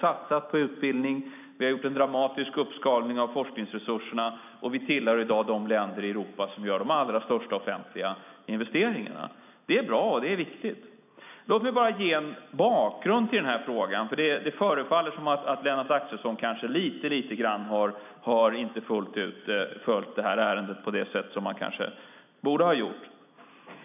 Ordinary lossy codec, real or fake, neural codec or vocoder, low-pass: none; real; none; 3.6 kHz